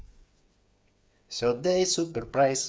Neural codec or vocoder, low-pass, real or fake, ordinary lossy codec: codec, 16 kHz, 8 kbps, FreqCodec, smaller model; none; fake; none